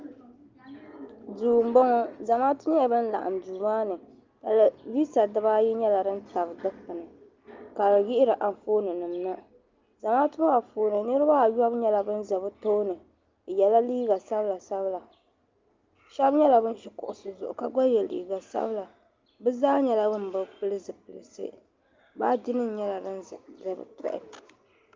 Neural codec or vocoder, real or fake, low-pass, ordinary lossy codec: none; real; 7.2 kHz; Opus, 24 kbps